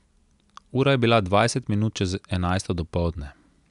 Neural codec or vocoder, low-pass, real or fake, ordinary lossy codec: none; 10.8 kHz; real; none